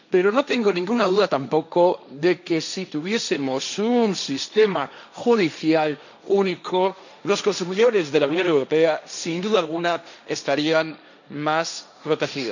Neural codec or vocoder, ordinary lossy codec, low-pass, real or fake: codec, 16 kHz, 1.1 kbps, Voila-Tokenizer; none; 7.2 kHz; fake